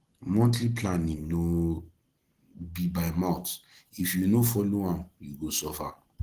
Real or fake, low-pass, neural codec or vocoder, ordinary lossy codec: real; 14.4 kHz; none; Opus, 16 kbps